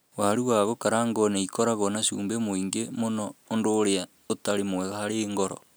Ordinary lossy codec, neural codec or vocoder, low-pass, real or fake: none; none; none; real